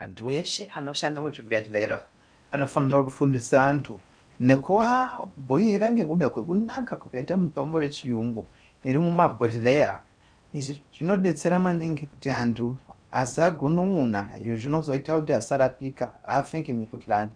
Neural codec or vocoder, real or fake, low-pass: codec, 16 kHz in and 24 kHz out, 0.6 kbps, FocalCodec, streaming, 4096 codes; fake; 9.9 kHz